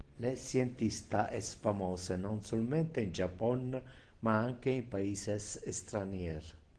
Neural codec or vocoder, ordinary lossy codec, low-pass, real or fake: none; Opus, 16 kbps; 9.9 kHz; real